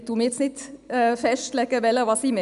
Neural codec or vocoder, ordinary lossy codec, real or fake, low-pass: none; none; real; 10.8 kHz